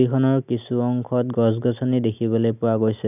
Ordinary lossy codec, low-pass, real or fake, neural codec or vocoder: none; 3.6 kHz; real; none